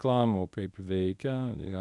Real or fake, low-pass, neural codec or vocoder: fake; 10.8 kHz; codec, 24 kHz, 0.9 kbps, WavTokenizer, medium speech release version 1